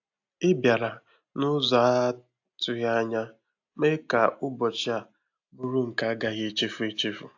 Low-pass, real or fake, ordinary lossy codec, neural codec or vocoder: 7.2 kHz; real; AAC, 48 kbps; none